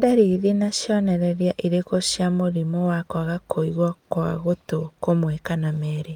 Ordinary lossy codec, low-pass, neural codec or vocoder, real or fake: Opus, 32 kbps; 19.8 kHz; none; real